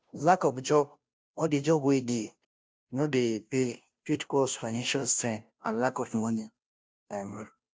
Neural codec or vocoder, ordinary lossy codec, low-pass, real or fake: codec, 16 kHz, 0.5 kbps, FunCodec, trained on Chinese and English, 25 frames a second; none; none; fake